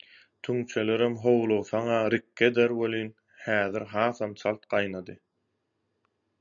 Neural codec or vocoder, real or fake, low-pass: none; real; 7.2 kHz